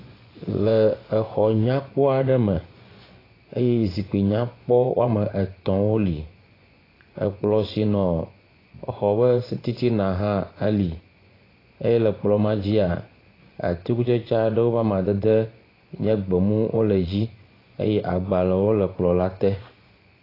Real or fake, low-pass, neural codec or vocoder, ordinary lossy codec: fake; 5.4 kHz; vocoder, 24 kHz, 100 mel bands, Vocos; AAC, 24 kbps